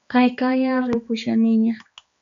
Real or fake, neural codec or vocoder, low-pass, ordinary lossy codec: fake; codec, 16 kHz, 2 kbps, X-Codec, HuBERT features, trained on balanced general audio; 7.2 kHz; MP3, 64 kbps